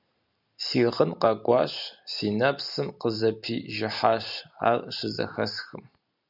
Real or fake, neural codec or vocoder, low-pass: real; none; 5.4 kHz